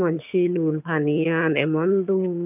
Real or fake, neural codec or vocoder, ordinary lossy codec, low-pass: fake; vocoder, 22.05 kHz, 80 mel bands, HiFi-GAN; none; 3.6 kHz